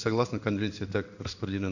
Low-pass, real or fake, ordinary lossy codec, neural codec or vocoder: 7.2 kHz; fake; none; codec, 16 kHz in and 24 kHz out, 1 kbps, XY-Tokenizer